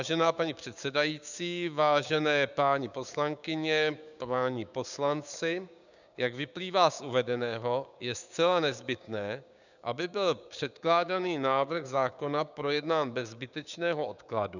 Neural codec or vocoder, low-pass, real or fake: codec, 16 kHz, 6 kbps, DAC; 7.2 kHz; fake